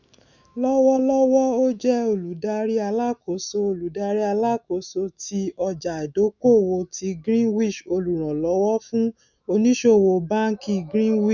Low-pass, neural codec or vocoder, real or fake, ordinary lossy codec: 7.2 kHz; autoencoder, 48 kHz, 128 numbers a frame, DAC-VAE, trained on Japanese speech; fake; none